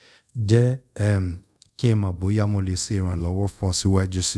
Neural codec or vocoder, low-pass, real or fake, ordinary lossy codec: codec, 24 kHz, 0.5 kbps, DualCodec; none; fake; none